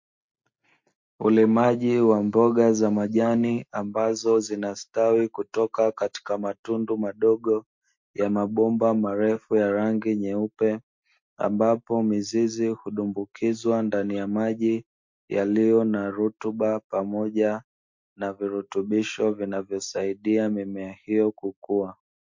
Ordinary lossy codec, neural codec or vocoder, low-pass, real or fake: MP3, 48 kbps; none; 7.2 kHz; real